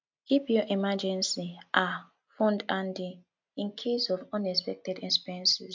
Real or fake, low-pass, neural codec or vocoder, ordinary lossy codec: real; 7.2 kHz; none; MP3, 64 kbps